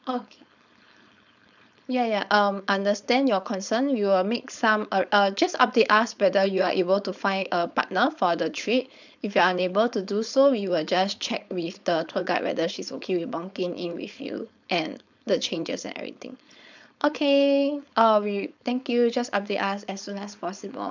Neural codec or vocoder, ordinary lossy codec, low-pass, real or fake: codec, 16 kHz, 4.8 kbps, FACodec; none; 7.2 kHz; fake